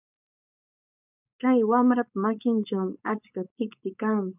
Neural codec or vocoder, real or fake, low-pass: codec, 16 kHz, 4.8 kbps, FACodec; fake; 3.6 kHz